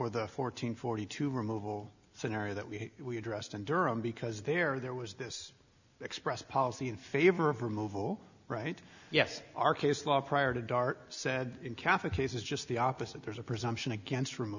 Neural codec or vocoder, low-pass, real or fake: none; 7.2 kHz; real